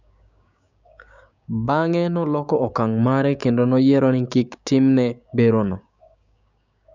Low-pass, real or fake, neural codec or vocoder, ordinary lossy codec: 7.2 kHz; fake; codec, 16 kHz, 6 kbps, DAC; none